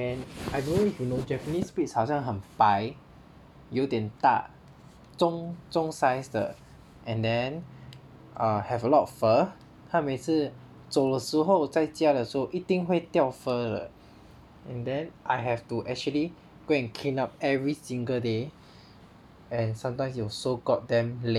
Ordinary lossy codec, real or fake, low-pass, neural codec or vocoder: none; real; 19.8 kHz; none